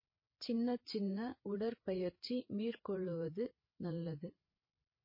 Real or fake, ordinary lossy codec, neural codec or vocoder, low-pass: fake; MP3, 24 kbps; codec, 16 kHz, 8 kbps, FreqCodec, larger model; 5.4 kHz